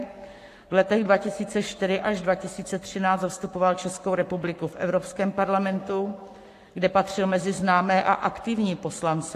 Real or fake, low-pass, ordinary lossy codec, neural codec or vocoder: fake; 14.4 kHz; AAC, 64 kbps; codec, 44.1 kHz, 7.8 kbps, Pupu-Codec